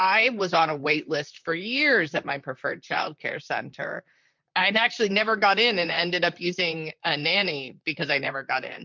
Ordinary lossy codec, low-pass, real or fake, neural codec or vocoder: MP3, 64 kbps; 7.2 kHz; fake; vocoder, 44.1 kHz, 128 mel bands, Pupu-Vocoder